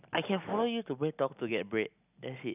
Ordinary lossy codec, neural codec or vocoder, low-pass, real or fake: none; none; 3.6 kHz; real